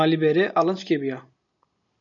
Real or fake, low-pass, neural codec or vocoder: real; 7.2 kHz; none